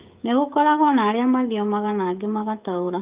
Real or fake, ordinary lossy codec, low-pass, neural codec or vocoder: fake; Opus, 24 kbps; 3.6 kHz; codec, 16 kHz, 16 kbps, FreqCodec, smaller model